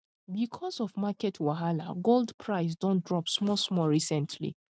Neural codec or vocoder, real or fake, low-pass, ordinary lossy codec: none; real; none; none